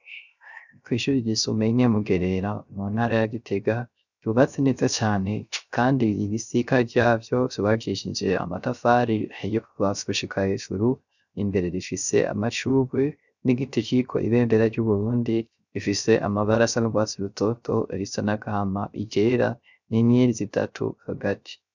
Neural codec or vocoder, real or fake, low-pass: codec, 16 kHz, 0.3 kbps, FocalCodec; fake; 7.2 kHz